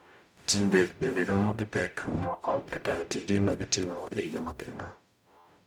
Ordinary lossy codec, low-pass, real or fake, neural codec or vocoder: none; 19.8 kHz; fake; codec, 44.1 kHz, 0.9 kbps, DAC